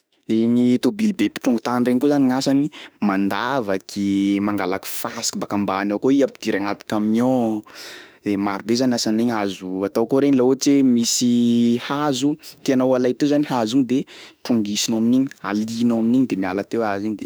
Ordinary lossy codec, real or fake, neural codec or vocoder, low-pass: none; fake; autoencoder, 48 kHz, 32 numbers a frame, DAC-VAE, trained on Japanese speech; none